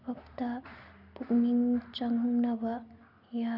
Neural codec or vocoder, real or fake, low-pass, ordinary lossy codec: none; real; 5.4 kHz; Opus, 64 kbps